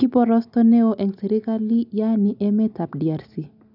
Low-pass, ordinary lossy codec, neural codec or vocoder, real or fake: 5.4 kHz; none; none; real